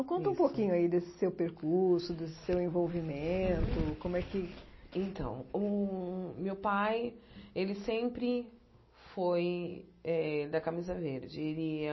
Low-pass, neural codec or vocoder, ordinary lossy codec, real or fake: 7.2 kHz; none; MP3, 24 kbps; real